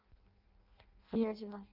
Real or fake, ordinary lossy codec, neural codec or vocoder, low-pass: fake; Opus, 24 kbps; codec, 16 kHz in and 24 kHz out, 0.6 kbps, FireRedTTS-2 codec; 5.4 kHz